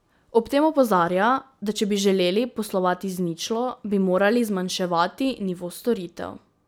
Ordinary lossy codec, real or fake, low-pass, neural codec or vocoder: none; real; none; none